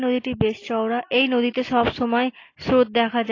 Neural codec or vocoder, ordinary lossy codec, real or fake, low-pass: none; AAC, 32 kbps; real; 7.2 kHz